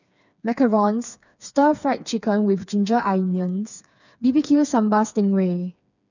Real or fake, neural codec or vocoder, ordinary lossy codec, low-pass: fake; codec, 16 kHz, 4 kbps, FreqCodec, smaller model; none; 7.2 kHz